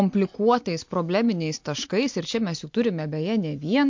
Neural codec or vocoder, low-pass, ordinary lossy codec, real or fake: none; 7.2 kHz; MP3, 48 kbps; real